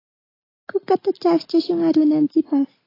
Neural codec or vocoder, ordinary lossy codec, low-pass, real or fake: none; AAC, 24 kbps; 5.4 kHz; real